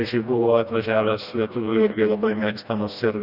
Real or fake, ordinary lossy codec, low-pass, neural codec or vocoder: fake; Opus, 64 kbps; 5.4 kHz; codec, 16 kHz, 1 kbps, FreqCodec, smaller model